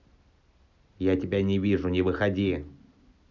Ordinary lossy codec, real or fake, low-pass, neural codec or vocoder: none; real; 7.2 kHz; none